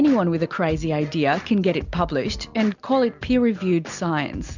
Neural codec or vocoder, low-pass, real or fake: none; 7.2 kHz; real